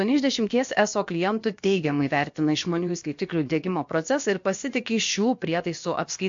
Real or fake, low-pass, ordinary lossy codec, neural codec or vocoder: fake; 7.2 kHz; MP3, 48 kbps; codec, 16 kHz, about 1 kbps, DyCAST, with the encoder's durations